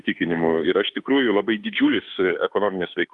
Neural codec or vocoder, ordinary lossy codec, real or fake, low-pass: autoencoder, 48 kHz, 32 numbers a frame, DAC-VAE, trained on Japanese speech; Opus, 32 kbps; fake; 10.8 kHz